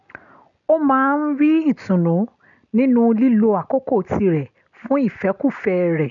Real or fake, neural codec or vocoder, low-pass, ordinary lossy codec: real; none; 7.2 kHz; none